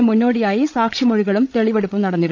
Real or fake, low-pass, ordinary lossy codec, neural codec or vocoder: fake; none; none; codec, 16 kHz, 16 kbps, FreqCodec, larger model